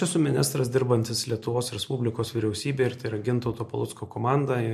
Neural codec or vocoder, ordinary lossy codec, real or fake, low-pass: none; MP3, 64 kbps; real; 14.4 kHz